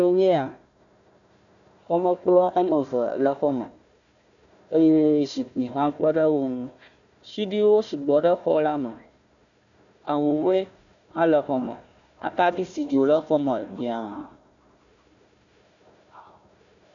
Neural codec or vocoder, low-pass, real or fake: codec, 16 kHz, 1 kbps, FunCodec, trained on Chinese and English, 50 frames a second; 7.2 kHz; fake